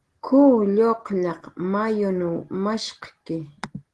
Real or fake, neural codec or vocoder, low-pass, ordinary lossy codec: real; none; 10.8 kHz; Opus, 16 kbps